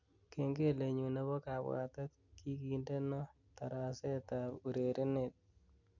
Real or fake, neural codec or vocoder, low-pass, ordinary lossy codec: real; none; 7.2 kHz; none